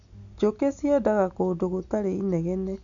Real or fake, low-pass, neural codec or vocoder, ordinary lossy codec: real; 7.2 kHz; none; none